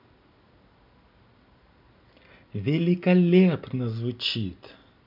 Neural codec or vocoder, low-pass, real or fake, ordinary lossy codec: none; 5.4 kHz; real; MP3, 48 kbps